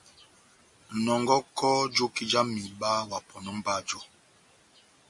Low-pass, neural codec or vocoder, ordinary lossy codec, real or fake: 10.8 kHz; none; MP3, 48 kbps; real